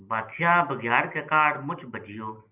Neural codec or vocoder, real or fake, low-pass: none; real; 3.6 kHz